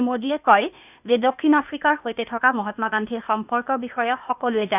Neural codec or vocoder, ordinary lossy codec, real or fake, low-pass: codec, 16 kHz, 0.8 kbps, ZipCodec; none; fake; 3.6 kHz